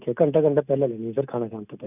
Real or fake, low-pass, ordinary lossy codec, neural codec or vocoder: real; 3.6 kHz; none; none